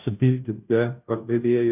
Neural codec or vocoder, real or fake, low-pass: codec, 16 kHz, 0.5 kbps, FunCodec, trained on Chinese and English, 25 frames a second; fake; 3.6 kHz